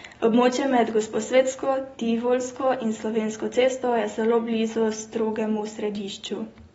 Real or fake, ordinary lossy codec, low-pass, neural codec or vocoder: real; AAC, 24 kbps; 19.8 kHz; none